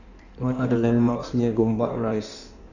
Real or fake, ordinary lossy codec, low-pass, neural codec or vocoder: fake; none; 7.2 kHz; codec, 16 kHz in and 24 kHz out, 1.1 kbps, FireRedTTS-2 codec